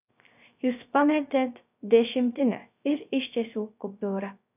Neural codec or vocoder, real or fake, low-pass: codec, 16 kHz, 0.3 kbps, FocalCodec; fake; 3.6 kHz